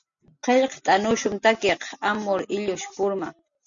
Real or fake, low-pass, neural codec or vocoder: real; 7.2 kHz; none